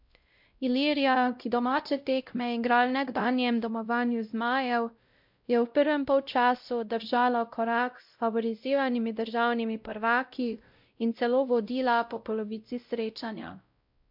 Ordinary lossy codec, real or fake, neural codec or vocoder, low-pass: MP3, 48 kbps; fake; codec, 16 kHz, 0.5 kbps, X-Codec, WavLM features, trained on Multilingual LibriSpeech; 5.4 kHz